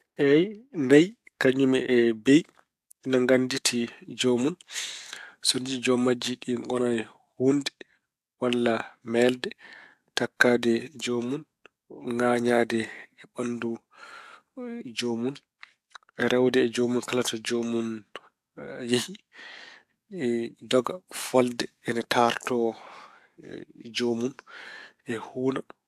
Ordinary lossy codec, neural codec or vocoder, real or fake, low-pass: AAC, 96 kbps; codec, 44.1 kHz, 7.8 kbps, DAC; fake; 14.4 kHz